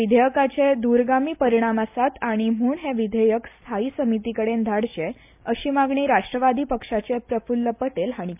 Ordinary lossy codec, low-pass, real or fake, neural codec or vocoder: MP3, 32 kbps; 3.6 kHz; real; none